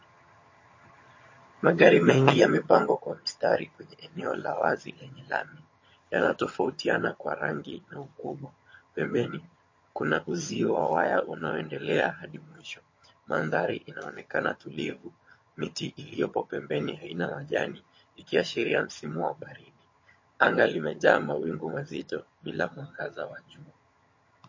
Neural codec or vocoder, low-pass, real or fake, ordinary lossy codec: vocoder, 22.05 kHz, 80 mel bands, HiFi-GAN; 7.2 kHz; fake; MP3, 32 kbps